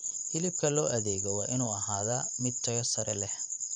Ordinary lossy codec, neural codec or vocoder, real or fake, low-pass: none; vocoder, 44.1 kHz, 128 mel bands every 512 samples, BigVGAN v2; fake; 9.9 kHz